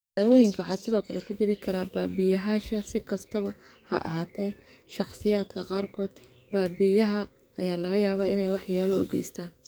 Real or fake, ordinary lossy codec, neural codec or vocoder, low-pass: fake; none; codec, 44.1 kHz, 2.6 kbps, SNAC; none